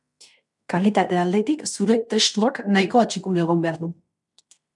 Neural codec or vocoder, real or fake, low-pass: codec, 16 kHz in and 24 kHz out, 0.9 kbps, LongCat-Audio-Codec, fine tuned four codebook decoder; fake; 10.8 kHz